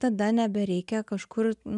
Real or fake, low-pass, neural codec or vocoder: real; 10.8 kHz; none